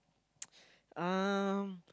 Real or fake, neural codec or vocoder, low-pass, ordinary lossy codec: real; none; none; none